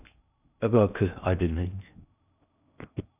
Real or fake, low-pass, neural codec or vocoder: fake; 3.6 kHz; codec, 16 kHz in and 24 kHz out, 0.6 kbps, FocalCodec, streaming, 2048 codes